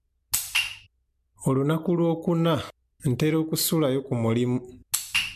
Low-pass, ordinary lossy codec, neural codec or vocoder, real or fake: 14.4 kHz; MP3, 96 kbps; none; real